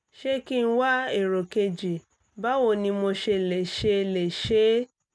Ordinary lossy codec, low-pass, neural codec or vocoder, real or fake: none; none; none; real